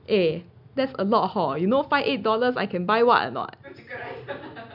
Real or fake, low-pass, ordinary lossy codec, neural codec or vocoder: real; 5.4 kHz; none; none